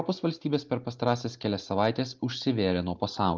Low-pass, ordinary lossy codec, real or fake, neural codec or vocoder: 7.2 kHz; Opus, 32 kbps; real; none